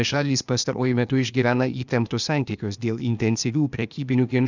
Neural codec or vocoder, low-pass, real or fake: codec, 16 kHz, 0.8 kbps, ZipCodec; 7.2 kHz; fake